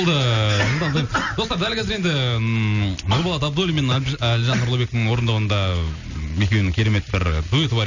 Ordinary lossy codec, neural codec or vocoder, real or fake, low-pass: none; none; real; 7.2 kHz